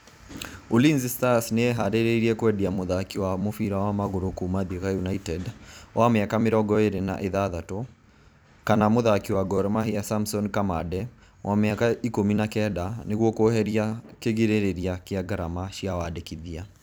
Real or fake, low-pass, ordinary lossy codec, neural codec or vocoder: fake; none; none; vocoder, 44.1 kHz, 128 mel bands every 256 samples, BigVGAN v2